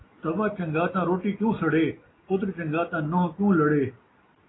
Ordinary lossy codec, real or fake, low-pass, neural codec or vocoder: AAC, 16 kbps; real; 7.2 kHz; none